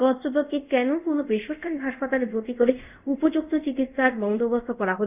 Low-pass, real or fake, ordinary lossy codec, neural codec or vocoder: 3.6 kHz; fake; none; codec, 24 kHz, 0.5 kbps, DualCodec